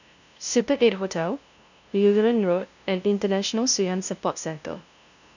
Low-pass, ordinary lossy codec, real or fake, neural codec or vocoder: 7.2 kHz; none; fake; codec, 16 kHz, 0.5 kbps, FunCodec, trained on LibriTTS, 25 frames a second